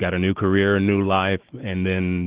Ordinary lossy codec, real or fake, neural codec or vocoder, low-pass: Opus, 16 kbps; real; none; 3.6 kHz